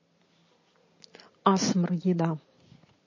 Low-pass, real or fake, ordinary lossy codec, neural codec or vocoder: 7.2 kHz; real; MP3, 32 kbps; none